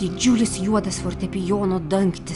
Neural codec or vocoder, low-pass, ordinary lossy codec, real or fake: none; 10.8 kHz; Opus, 64 kbps; real